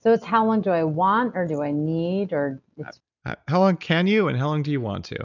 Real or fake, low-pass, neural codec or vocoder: real; 7.2 kHz; none